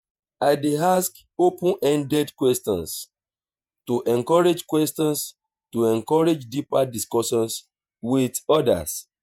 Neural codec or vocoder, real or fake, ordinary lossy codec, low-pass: vocoder, 48 kHz, 128 mel bands, Vocos; fake; MP3, 96 kbps; 19.8 kHz